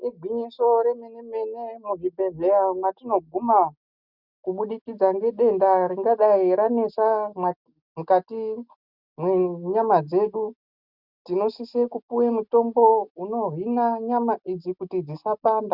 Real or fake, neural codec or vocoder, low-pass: real; none; 5.4 kHz